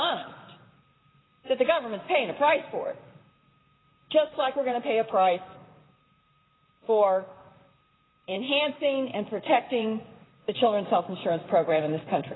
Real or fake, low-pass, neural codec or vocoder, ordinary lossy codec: fake; 7.2 kHz; vocoder, 44.1 kHz, 128 mel bands, Pupu-Vocoder; AAC, 16 kbps